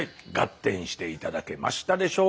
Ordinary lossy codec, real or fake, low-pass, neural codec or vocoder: none; real; none; none